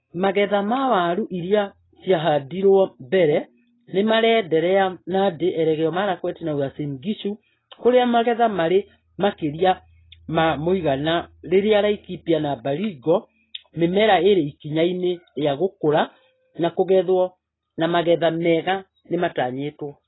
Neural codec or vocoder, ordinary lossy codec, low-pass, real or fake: none; AAC, 16 kbps; 7.2 kHz; real